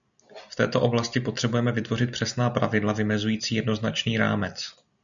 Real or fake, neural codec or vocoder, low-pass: real; none; 7.2 kHz